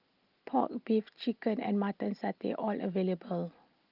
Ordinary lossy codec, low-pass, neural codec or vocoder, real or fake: Opus, 24 kbps; 5.4 kHz; none; real